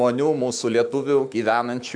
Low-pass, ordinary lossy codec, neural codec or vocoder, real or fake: 9.9 kHz; MP3, 96 kbps; autoencoder, 48 kHz, 128 numbers a frame, DAC-VAE, trained on Japanese speech; fake